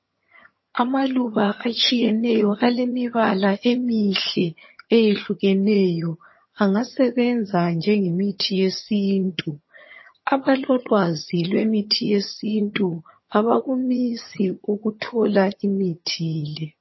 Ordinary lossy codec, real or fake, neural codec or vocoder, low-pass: MP3, 24 kbps; fake; vocoder, 22.05 kHz, 80 mel bands, HiFi-GAN; 7.2 kHz